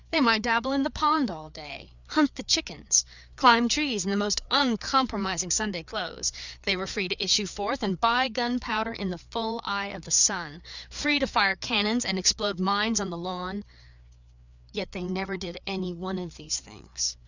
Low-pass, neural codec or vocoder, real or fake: 7.2 kHz; codec, 16 kHz, 4 kbps, FreqCodec, larger model; fake